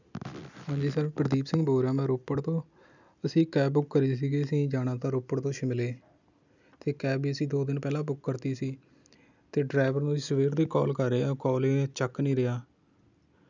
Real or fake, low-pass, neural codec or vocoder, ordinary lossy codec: real; 7.2 kHz; none; none